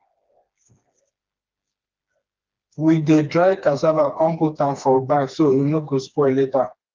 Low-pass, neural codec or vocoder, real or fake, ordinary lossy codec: 7.2 kHz; codec, 16 kHz, 2 kbps, FreqCodec, smaller model; fake; Opus, 32 kbps